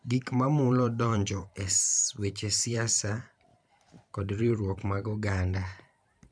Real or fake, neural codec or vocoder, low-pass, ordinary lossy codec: fake; vocoder, 44.1 kHz, 128 mel bands every 512 samples, BigVGAN v2; 9.9 kHz; none